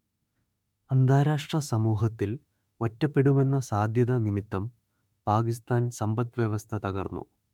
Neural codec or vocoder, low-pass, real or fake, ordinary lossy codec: autoencoder, 48 kHz, 32 numbers a frame, DAC-VAE, trained on Japanese speech; 19.8 kHz; fake; MP3, 96 kbps